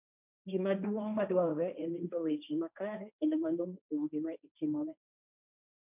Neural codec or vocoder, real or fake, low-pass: codec, 16 kHz, 1.1 kbps, Voila-Tokenizer; fake; 3.6 kHz